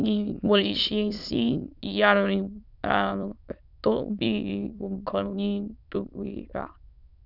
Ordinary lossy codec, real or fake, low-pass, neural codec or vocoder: none; fake; 5.4 kHz; autoencoder, 22.05 kHz, a latent of 192 numbers a frame, VITS, trained on many speakers